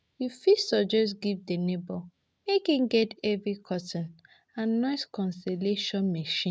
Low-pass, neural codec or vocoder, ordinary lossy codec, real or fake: none; none; none; real